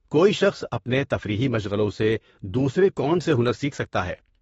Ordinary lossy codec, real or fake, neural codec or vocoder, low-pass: AAC, 24 kbps; fake; autoencoder, 48 kHz, 32 numbers a frame, DAC-VAE, trained on Japanese speech; 19.8 kHz